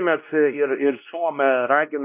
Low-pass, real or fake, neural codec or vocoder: 3.6 kHz; fake; codec, 16 kHz, 1 kbps, X-Codec, WavLM features, trained on Multilingual LibriSpeech